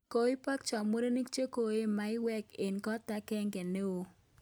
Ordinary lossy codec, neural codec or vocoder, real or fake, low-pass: none; none; real; none